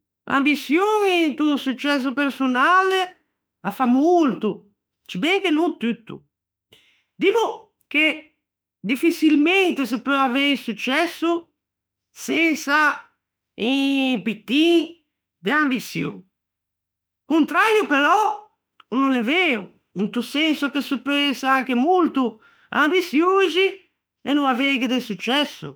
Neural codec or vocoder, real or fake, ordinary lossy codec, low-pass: autoencoder, 48 kHz, 32 numbers a frame, DAC-VAE, trained on Japanese speech; fake; none; none